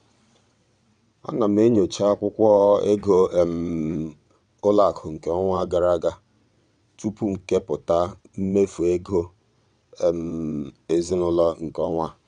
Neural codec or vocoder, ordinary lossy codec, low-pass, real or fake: vocoder, 22.05 kHz, 80 mel bands, WaveNeXt; none; 9.9 kHz; fake